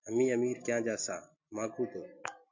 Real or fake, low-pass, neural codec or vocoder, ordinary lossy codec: real; 7.2 kHz; none; MP3, 48 kbps